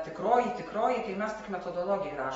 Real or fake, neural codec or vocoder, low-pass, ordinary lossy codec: real; none; 10.8 kHz; AAC, 24 kbps